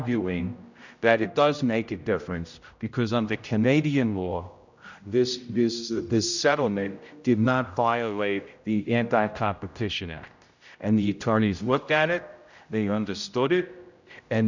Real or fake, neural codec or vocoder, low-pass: fake; codec, 16 kHz, 0.5 kbps, X-Codec, HuBERT features, trained on general audio; 7.2 kHz